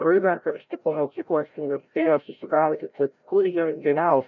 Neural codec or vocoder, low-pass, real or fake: codec, 16 kHz, 0.5 kbps, FreqCodec, larger model; 7.2 kHz; fake